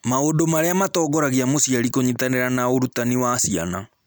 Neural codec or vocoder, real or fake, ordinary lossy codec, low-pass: none; real; none; none